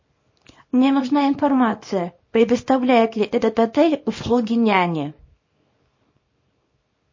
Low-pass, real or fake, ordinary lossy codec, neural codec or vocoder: 7.2 kHz; fake; MP3, 32 kbps; codec, 24 kHz, 0.9 kbps, WavTokenizer, small release